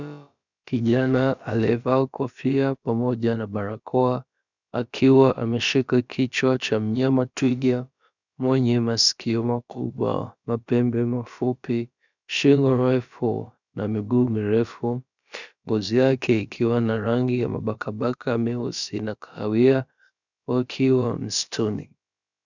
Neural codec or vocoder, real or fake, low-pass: codec, 16 kHz, about 1 kbps, DyCAST, with the encoder's durations; fake; 7.2 kHz